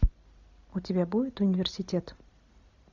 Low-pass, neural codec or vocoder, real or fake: 7.2 kHz; none; real